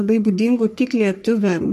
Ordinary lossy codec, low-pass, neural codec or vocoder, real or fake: MP3, 64 kbps; 14.4 kHz; codec, 44.1 kHz, 3.4 kbps, Pupu-Codec; fake